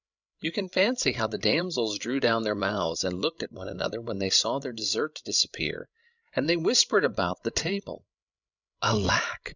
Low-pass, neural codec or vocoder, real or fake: 7.2 kHz; codec, 16 kHz, 16 kbps, FreqCodec, larger model; fake